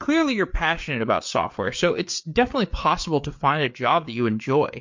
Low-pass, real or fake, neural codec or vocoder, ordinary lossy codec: 7.2 kHz; fake; codec, 16 kHz, 4 kbps, FreqCodec, larger model; MP3, 48 kbps